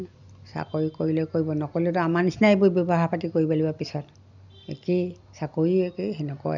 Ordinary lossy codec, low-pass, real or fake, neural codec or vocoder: none; 7.2 kHz; real; none